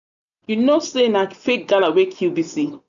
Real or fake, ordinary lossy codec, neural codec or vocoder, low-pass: real; none; none; 7.2 kHz